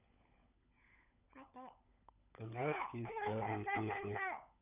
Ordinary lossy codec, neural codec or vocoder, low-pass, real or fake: none; codec, 16 kHz, 16 kbps, FunCodec, trained on LibriTTS, 50 frames a second; 3.6 kHz; fake